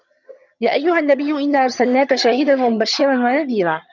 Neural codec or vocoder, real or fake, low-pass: vocoder, 22.05 kHz, 80 mel bands, HiFi-GAN; fake; 7.2 kHz